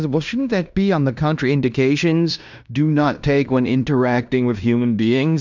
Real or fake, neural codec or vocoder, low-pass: fake; codec, 16 kHz in and 24 kHz out, 0.9 kbps, LongCat-Audio-Codec, fine tuned four codebook decoder; 7.2 kHz